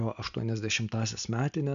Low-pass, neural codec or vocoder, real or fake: 7.2 kHz; none; real